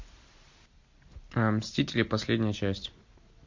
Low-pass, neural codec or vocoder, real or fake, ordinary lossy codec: 7.2 kHz; none; real; MP3, 48 kbps